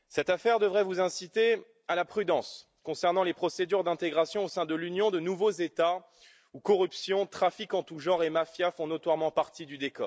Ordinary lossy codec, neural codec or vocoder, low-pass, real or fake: none; none; none; real